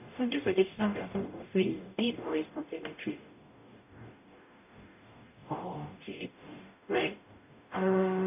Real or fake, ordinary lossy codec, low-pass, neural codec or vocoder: fake; none; 3.6 kHz; codec, 44.1 kHz, 0.9 kbps, DAC